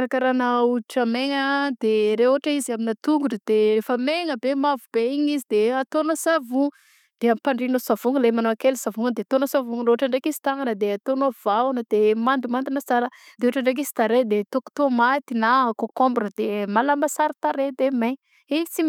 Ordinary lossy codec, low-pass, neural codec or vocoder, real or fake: none; 19.8 kHz; none; real